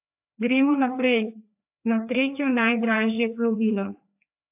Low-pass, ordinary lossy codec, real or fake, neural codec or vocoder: 3.6 kHz; AAC, 32 kbps; fake; codec, 16 kHz, 2 kbps, FreqCodec, larger model